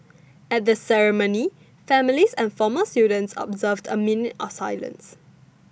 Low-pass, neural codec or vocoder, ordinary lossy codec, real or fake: none; none; none; real